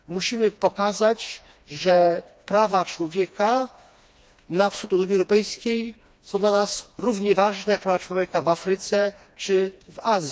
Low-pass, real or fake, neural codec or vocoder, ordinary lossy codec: none; fake; codec, 16 kHz, 2 kbps, FreqCodec, smaller model; none